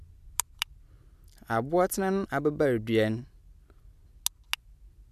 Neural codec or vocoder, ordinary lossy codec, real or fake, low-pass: none; none; real; 14.4 kHz